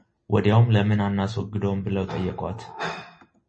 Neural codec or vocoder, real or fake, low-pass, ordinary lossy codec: none; real; 9.9 kHz; MP3, 32 kbps